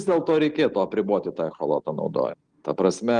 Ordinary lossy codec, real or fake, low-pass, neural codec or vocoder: Opus, 24 kbps; real; 9.9 kHz; none